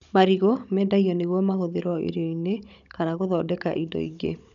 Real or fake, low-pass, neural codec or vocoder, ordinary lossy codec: fake; 7.2 kHz; codec, 16 kHz, 16 kbps, FunCodec, trained on Chinese and English, 50 frames a second; none